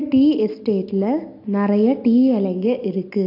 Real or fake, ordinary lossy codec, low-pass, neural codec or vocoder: real; AAC, 32 kbps; 5.4 kHz; none